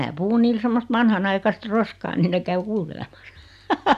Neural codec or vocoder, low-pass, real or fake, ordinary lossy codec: none; 14.4 kHz; real; none